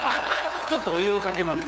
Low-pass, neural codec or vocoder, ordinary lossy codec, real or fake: none; codec, 16 kHz, 2 kbps, FunCodec, trained on LibriTTS, 25 frames a second; none; fake